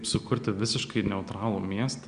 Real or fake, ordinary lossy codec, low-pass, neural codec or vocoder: real; Opus, 64 kbps; 9.9 kHz; none